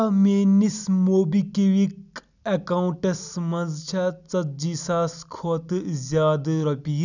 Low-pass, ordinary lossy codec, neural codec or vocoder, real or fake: 7.2 kHz; none; none; real